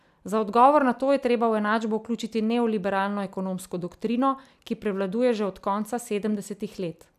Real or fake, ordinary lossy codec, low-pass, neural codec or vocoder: real; none; 14.4 kHz; none